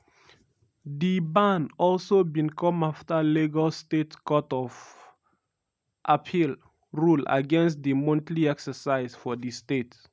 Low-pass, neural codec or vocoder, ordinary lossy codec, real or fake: none; none; none; real